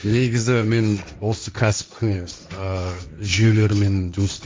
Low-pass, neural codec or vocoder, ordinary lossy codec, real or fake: none; codec, 16 kHz, 1.1 kbps, Voila-Tokenizer; none; fake